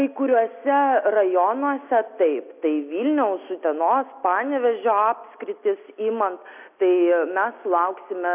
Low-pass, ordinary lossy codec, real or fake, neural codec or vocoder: 3.6 kHz; AAC, 32 kbps; real; none